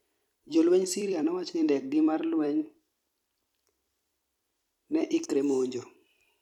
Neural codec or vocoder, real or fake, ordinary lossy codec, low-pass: vocoder, 44.1 kHz, 128 mel bands every 256 samples, BigVGAN v2; fake; none; 19.8 kHz